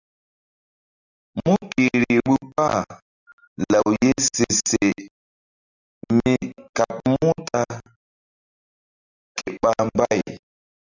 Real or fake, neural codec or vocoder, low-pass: real; none; 7.2 kHz